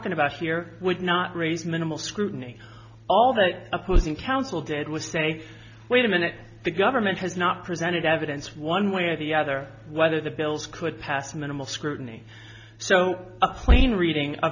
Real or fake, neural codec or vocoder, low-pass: real; none; 7.2 kHz